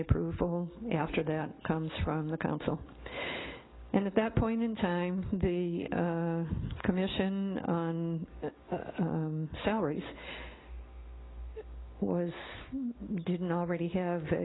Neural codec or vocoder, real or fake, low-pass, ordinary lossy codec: none; real; 7.2 kHz; AAC, 16 kbps